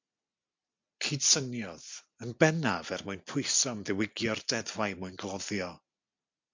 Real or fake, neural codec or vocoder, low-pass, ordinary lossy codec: real; none; 7.2 kHz; MP3, 64 kbps